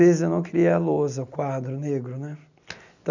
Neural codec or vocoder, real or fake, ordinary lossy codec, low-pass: none; real; none; 7.2 kHz